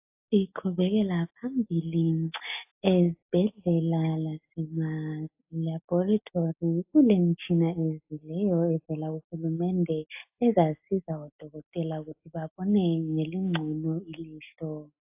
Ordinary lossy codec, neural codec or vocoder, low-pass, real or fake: AAC, 32 kbps; none; 3.6 kHz; real